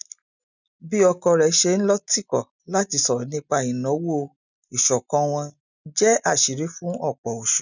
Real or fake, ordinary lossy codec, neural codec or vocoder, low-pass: real; none; none; 7.2 kHz